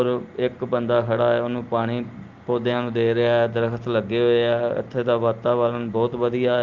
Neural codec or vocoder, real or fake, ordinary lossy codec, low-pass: none; real; Opus, 32 kbps; 7.2 kHz